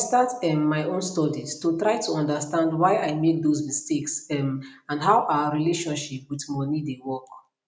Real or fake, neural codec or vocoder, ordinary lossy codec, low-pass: real; none; none; none